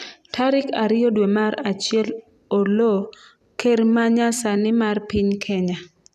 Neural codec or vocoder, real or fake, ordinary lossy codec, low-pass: vocoder, 44.1 kHz, 128 mel bands every 256 samples, BigVGAN v2; fake; none; 14.4 kHz